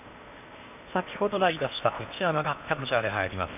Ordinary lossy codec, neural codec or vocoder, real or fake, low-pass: none; codec, 16 kHz in and 24 kHz out, 0.8 kbps, FocalCodec, streaming, 65536 codes; fake; 3.6 kHz